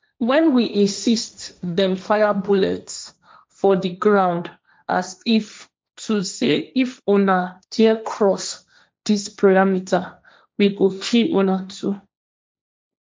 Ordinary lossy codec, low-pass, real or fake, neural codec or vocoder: none; none; fake; codec, 16 kHz, 1.1 kbps, Voila-Tokenizer